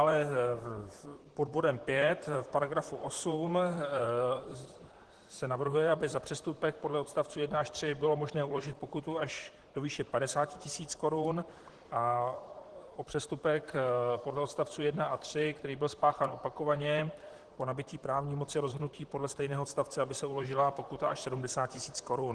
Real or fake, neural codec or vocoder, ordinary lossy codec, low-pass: fake; vocoder, 44.1 kHz, 128 mel bands, Pupu-Vocoder; Opus, 16 kbps; 10.8 kHz